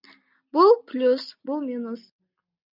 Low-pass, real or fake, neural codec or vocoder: 5.4 kHz; real; none